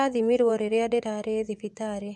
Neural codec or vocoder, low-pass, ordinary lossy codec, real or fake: vocoder, 24 kHz, 100 mel bands, Vocos; none; none; fake